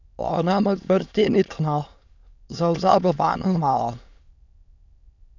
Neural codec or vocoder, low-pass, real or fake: autoencoder, 22.05 kHz, a latent of 192 numbers a frame, VITS, trained on many speakers; 7.2 kHz; fake